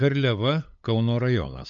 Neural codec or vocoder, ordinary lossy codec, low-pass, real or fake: codec, 16 kHz, 8 kbps, FunCodec, trained on LibriTTS, 25 frames a second; AAC, 64 kbps; 7.2 kHz; fake